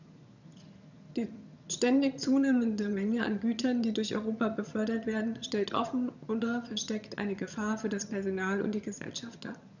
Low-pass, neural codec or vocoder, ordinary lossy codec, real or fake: 7.2 kHz; vocoder, 22.05 kHz, 80 mel bands, HiFi-GAN; none; fake